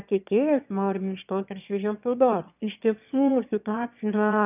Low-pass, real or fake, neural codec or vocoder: 3.6 kHz; fake; autoencoder, 22.05 kHz, a latent of 192 numbers a frame, VITS, trained on one speaker